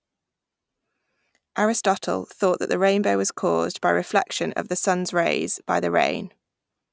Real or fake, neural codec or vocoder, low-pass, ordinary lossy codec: real; none; none; none